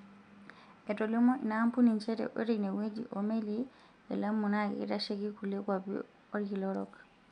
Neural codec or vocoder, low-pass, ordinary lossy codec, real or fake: none; 9.9 kHz; none; real